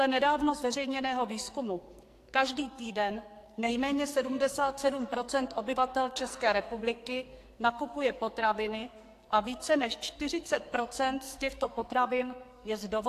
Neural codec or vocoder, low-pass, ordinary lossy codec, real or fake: codec, 44.1 kHz, 2.6 kbps, SNAC; 14.4 kHz; AAC, 64 kbps; fake